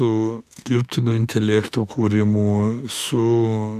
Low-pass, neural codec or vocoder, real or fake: 14.4 kHz; autoencoder, 48 kHz, 32 numbers a frame, DAC-VAE, trained on Japanese speech; fake